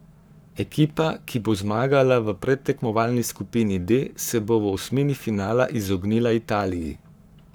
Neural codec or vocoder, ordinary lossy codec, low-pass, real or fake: codec, 44.1 kHz, 7.8 kbps, Pupu-Codec; none; none; fake